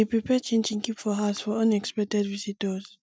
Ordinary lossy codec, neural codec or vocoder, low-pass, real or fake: none; none; none; real